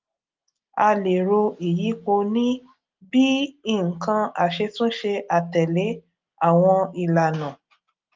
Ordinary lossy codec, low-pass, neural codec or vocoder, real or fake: Opus, 24 kbps; 7.2 kHz; none; real